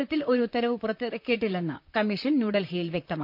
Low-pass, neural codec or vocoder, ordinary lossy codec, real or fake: 5.4 kHz; vocoder, 44.1 kHz, 128 mel bands, Pupu-Vocoder; none; fake